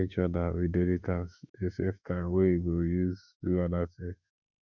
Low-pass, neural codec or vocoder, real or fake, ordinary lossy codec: 7.2 kHz; autoencoder, 48 kHz, 32 numbers a frame, DAC-VAE, trained on Japanese speech; fake; none